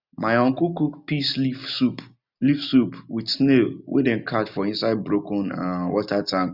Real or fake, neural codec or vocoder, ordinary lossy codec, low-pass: real; none; none; 5.4 kHz